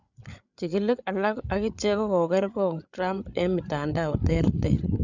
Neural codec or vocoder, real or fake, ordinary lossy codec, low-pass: codec, 16 kHz, 8 kbps, FreqCodec, larger model; fake; none; 7.2 kHz